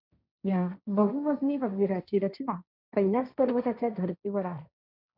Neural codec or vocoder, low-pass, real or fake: codec, 16 kHz, 1.1 kbps, Voila-Tokenizer; 5.4 kHz; fake